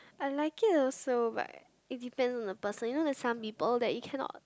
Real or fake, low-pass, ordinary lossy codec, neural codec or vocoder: real; none; none; none